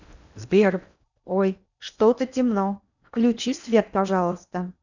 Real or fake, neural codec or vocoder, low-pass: fake; codec, 16 kHz in and 24 kHz out, 0.8 kbps, FocalCodec, streaming, 65536 codes; 7.2 kHz